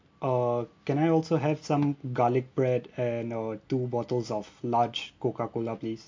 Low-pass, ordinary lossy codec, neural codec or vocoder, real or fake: 7.2 kHz; MP3, 48 kbps; none; real